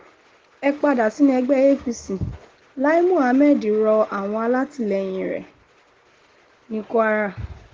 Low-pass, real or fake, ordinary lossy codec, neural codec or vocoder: 7.2 kHz; real; Opus, 16 kbps; none